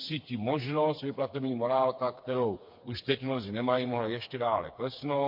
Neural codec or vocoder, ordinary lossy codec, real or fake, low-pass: codec, 16 kHz, 4 kbps, FreqCodec, smaller model; MP3, 32 kbps; fake; 5.4 kHz